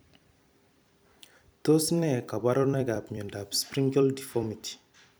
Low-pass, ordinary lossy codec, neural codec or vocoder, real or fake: none; none; vocoder, 44.1 kHz, 128 mel bands every 256 samples, BigVGAN v2; fake